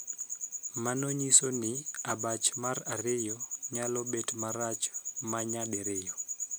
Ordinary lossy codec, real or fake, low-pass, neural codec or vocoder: none; real; none; none